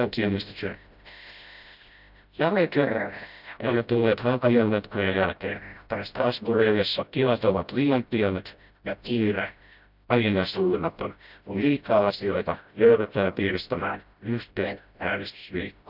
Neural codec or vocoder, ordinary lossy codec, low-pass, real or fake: codec, 16 kHz, 0.5 kbps, FreqCodec, smaller model; none; 5.4 kHz; fake